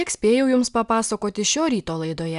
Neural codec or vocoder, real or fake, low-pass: none; real; 10.8 kHz